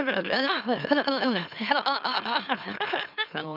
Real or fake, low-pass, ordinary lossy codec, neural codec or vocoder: fake; 5.4 kHz; none; autoencoder, 44.1 kHz, a latent of 192 numbers a frame, MeloTTS